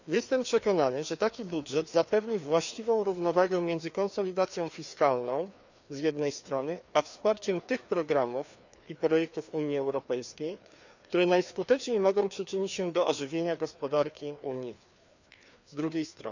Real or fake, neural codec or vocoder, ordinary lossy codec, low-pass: fake; codec, 16 kHz, 2 kbps, FreqCodec, larger model; none; 7.2 kHz